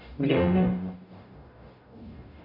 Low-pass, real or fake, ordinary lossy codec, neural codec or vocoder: 5.4 kHz; fake; none; codec, 44.1 kHz, 0.9 kbps, DAC